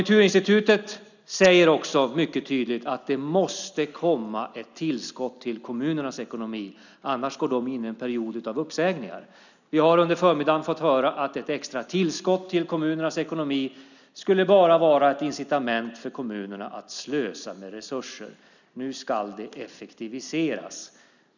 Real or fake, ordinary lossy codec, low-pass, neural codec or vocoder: real; none; 7.2 kHz; none